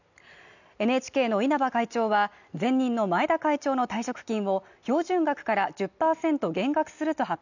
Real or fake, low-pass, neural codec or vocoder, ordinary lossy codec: real; 7.2 kHz; none; none